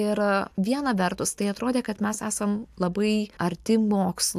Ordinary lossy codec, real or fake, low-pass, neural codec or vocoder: AAC, 96 kbps; real; 14.4 kHz; none